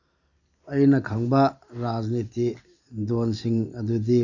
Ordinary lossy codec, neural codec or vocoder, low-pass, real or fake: none; none; 7.2 kHz; real